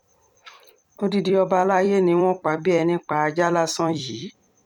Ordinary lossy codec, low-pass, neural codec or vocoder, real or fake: none; 19.8 kHz; vocoder, 44.1 kHz, 128 mel bands every 256 samples, BigVGAN v2; fake